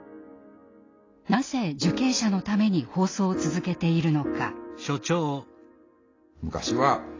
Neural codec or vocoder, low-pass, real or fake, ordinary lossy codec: none; 7.2 kHz; real; AAC, 32 kbps